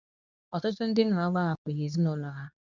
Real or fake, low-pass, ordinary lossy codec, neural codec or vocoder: fake; 7.2 kHz; none; codec, 24 kHz, 0.9 kbps, WavTokenizer, medium speech release version 2